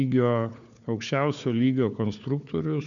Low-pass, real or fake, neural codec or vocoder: 7.2 kHz; fake; codec, 16 kHz, 4 kbps, FunCodec, trained on Chinese and English, 50 frames a second